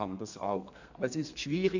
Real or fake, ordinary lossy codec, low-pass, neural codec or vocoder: fake; none; 7.2 kHz; codec, 32 kHz, 1.9 kbps, SNAC